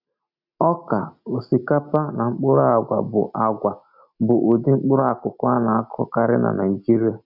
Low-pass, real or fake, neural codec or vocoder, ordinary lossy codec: 5.4 kHz; real; none; none